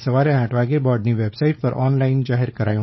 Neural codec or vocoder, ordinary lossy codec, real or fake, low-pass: codec, 16 kHz, 4.8 kbps, FACodec; MP3, 24 kbps; fake; 7.2 kHz